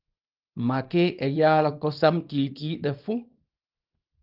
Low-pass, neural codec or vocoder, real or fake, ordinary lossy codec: 5.4 kHz; codec, 24 kHz, 0.9 kbps, WavTokenizer, small release; fake; Opus, 32 kbps